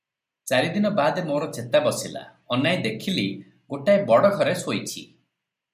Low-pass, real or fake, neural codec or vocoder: 14.4 kHz; real; none